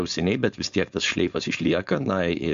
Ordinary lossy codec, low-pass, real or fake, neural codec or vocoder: MP3, 64 kbps; 7.2 kHz; fake; codec, 16 kHz, 4.8 kbps, FACodec